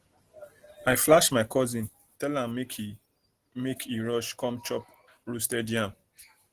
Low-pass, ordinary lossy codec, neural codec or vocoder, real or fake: 14.4 kHz; Opus, 16 kbps; none; real